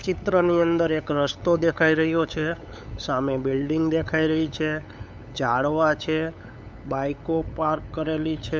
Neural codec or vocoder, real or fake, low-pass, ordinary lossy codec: codec, 16 kHz, 8 kbps, FunCodec, trained on LibriTTS, 25 frames a second; fake; none; none